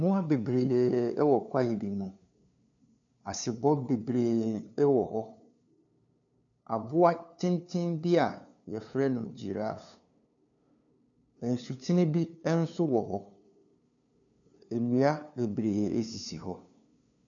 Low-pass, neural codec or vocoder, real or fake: 7.2 kHz; codec, 16 kHz, 2 kbps, FunCodec, trained on LibriTTS, 25 frames a second; fake